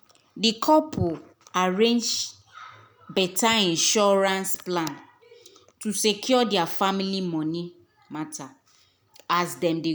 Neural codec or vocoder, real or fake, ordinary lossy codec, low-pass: none; real; none; none